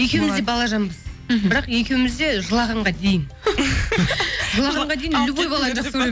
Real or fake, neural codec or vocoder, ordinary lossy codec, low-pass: real; none; none; none